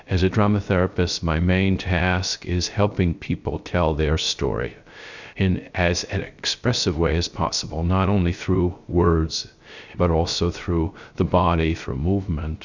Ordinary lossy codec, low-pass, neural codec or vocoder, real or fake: Opus, 64 kbps; 7.2 kHz; codec, 16 kHz, 0.3 kbps, FocalCodec; fake